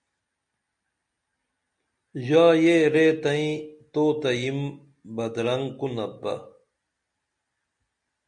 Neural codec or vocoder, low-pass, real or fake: none; 9.9 kHz; real